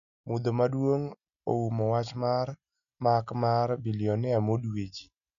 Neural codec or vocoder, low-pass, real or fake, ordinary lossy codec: none; 7.2 kHz; real; none